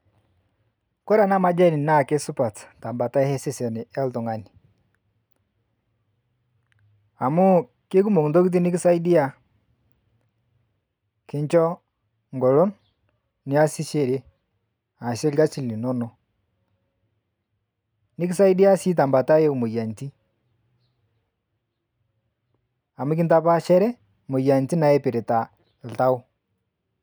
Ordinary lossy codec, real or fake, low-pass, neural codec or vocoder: none; real; none; none